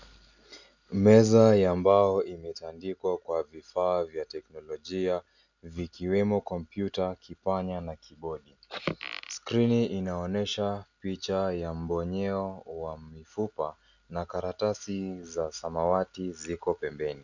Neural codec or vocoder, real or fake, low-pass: none; real; 7.2 kHz